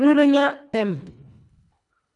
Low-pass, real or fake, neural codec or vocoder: 10.8 kHz; fake; codec, 24 kHz, 1.5 kbps, HILCodec